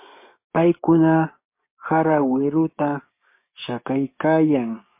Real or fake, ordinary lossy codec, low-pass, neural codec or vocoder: fake; MP3, 24 kbps; 3.6 kHz; codec, 16 kHz, 6 kbps, DAC